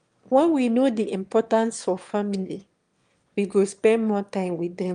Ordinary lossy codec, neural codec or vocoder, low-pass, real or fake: Opus, 32 kbps; autoencoder, 22.05 kHz, a latent of 192 numbers a frame, VITS, trained on one speaker; 9.9 kHz; fake